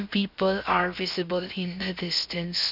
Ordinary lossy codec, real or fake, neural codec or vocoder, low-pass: none; fake; codec, 16 kHz, 0.7 kbps, FocalCodec; 5.4 kHz